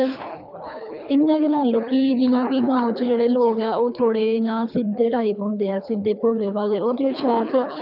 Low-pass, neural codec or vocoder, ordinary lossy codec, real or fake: 5.4 kHz; codec, 24 kHz, 3 kbps, HILCodec; none; fake